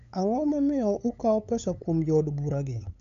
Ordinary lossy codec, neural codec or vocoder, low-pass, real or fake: MP3, 64 kbps; codec, 16 kHz, 8 kbps, FunCodec, trained on LibriTTS, 25 frames a second; 7.2 kHz; fake